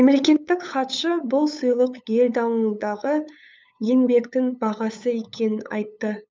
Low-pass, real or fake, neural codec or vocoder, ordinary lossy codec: none; fake; codec, 16 kHz, 8 kbps, FunCodec, trained on LibriTTS, 25 frames a second; none